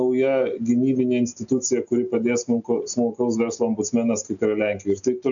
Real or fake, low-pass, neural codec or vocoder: real; 7.2 kHz; none